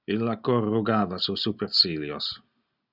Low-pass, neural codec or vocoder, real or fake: 5.4 kHz; none; real